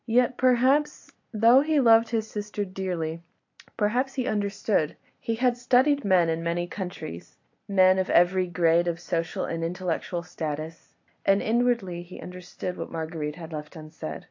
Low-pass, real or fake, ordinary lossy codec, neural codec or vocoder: 7.2 kHz; real; AAC, 48 kbps; none